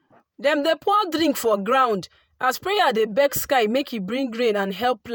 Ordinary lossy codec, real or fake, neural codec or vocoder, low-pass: none; fake; vocoder, 48 kHz, 128 mel bands, Vocos; none